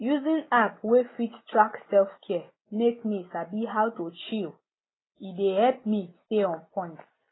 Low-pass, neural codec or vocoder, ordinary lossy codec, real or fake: 7.2 kHz; none; AAC, 16 kbps; real